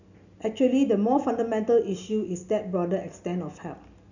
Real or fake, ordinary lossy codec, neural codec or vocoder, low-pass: real; none; none; 7.2 kHz